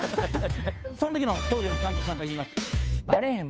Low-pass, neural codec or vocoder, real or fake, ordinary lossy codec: none; codec, 16 kHz, 2 kbps, FunCodec, trained on Chinese and English, 25 frames a second; fake; none